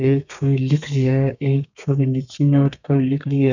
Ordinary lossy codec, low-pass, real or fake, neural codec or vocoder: none; 7.2 kHz; fake; codec, 32 kHz, 1.9 kbps, SNAC